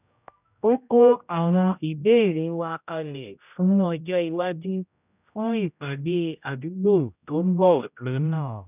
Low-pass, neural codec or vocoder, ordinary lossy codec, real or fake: 3.6 kHz; codec, 16 kHz, 0.5 kbps, X-Codec, HuBERT features, trained on general audio; none; fake